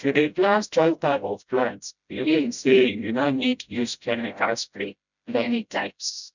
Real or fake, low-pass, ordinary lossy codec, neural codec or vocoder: fake; 7.2 kHz; none; codec, 16 kHz, 0.5 kbps, FreqCodec, smaller model